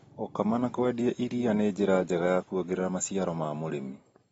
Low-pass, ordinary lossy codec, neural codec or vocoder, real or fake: 19.8 kHz; AAC, 24 kbps; none; real